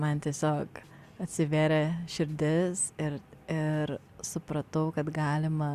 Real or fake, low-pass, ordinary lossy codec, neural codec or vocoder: real; 14.4 kHz; Opus, 64 kbps; none